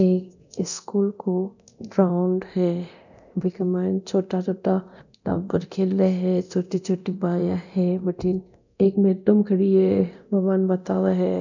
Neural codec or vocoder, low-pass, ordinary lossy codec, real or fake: codec, 24 kHz, 0.9 kbps, DualCodec; 7.2 kHz; none; fake